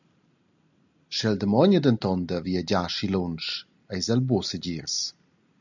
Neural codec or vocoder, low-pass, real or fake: none; 7.2 kHz; real